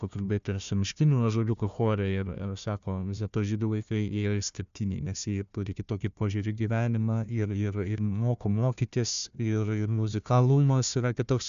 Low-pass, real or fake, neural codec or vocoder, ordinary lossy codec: 7.2 kHz; fake; codec, 16 kHz, 1 kbps, FunCodec, trained on Chinese and English, 50 frames a second; AAC, 96 kbps